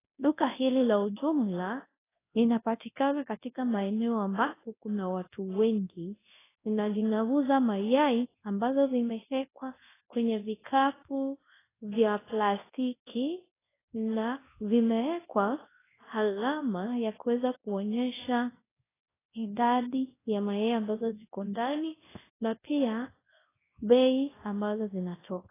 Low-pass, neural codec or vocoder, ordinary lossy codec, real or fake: 3.6 kHz; codec, 24 kHz, 0.9 kbps, WavTokenizer, large speech release; AAC, 16 kbps; fake